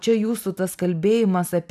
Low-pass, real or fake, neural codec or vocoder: 14.4 kHz; real; none